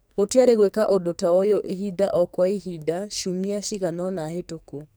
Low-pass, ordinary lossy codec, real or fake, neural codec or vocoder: none; none; fake; codec, 44.1 kHz, 2.6 kbps, SNAC